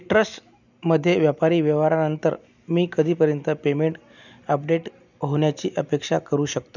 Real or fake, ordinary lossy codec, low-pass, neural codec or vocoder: real; none; 7.2 kHz; none